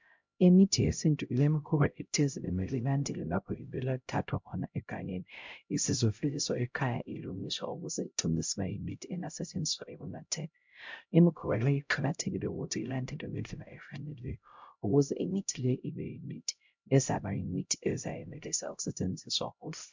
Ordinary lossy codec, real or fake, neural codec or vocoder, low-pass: MP3, 64 kbps; fake; codec, 16 kHz, 0.5 kbps, X-Codec, HuBERT features, trained on LibriSpeech; 7.2 kHz